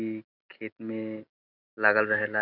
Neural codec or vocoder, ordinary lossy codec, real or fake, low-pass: none; none; real; 5.4 kHz